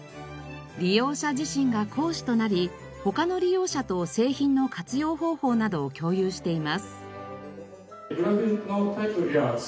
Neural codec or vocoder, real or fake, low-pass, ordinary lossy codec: none; real; none; none